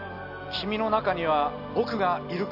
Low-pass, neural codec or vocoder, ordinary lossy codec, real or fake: 5.4 kHz; none; AAC, 48 kbps; real